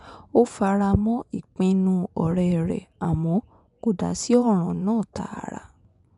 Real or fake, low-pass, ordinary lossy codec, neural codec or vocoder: fake; 10.8 kHz; none; vocoder, 24 kHz, 100 mel bands, Vocos